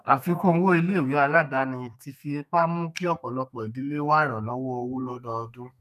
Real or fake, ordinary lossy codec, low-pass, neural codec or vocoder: fake; none; 14.4 kHz; codec, 32 kHz, 1.9 kbps, SNAC